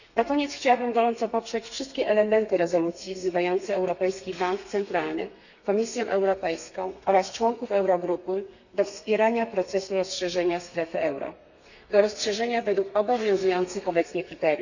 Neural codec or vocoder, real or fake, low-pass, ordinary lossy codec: codec, 32 kHz, 1.9 kbps, SNAC; fake; 7.2 kHz; none